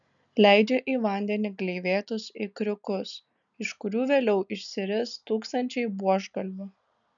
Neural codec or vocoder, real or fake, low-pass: none; real; 7.2 kHz